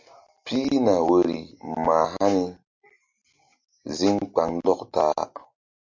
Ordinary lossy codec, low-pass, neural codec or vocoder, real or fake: MP3, 48 kbps; 7.2 kHz; none; real